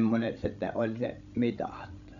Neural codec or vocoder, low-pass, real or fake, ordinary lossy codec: codec, 16 kHz, 16 kbps, FreqCodec, larger model; 7.2 kHz; fake; none